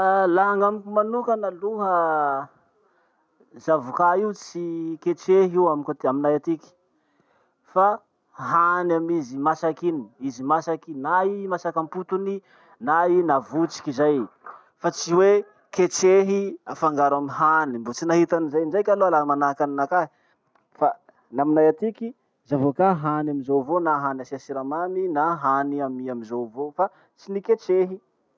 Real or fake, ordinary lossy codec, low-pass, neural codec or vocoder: real; none; none; none